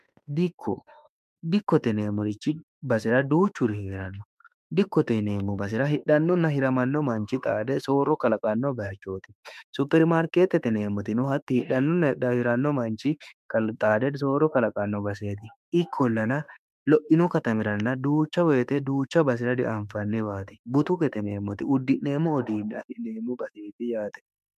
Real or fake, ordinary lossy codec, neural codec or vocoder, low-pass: fake; MP3, 96 kbps; autoencoder, 48 kHz, 32 numbers a frame, DAC-VAE, trained on Japanese speech; 14.4 kHz